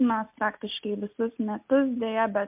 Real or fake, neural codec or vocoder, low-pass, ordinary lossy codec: real; none; 3.6 kHz; MP3, 32 kbps